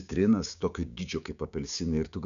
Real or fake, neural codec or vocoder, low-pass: fake; codec, 16 kHz, 6 kbps, DAC; 7.2 kHz